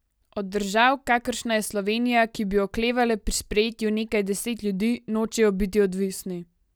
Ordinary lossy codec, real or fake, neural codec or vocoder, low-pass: none; real; none; none